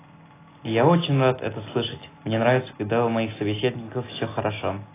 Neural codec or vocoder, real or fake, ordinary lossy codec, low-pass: none; real; AAC, 16 kbps; 3.6 kHz